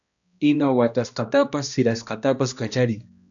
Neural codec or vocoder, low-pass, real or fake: codec, 16 kHz, 1 kbps, X-Codec, HuBERT features, trained on balanced general audio; 7.2 kHz; fake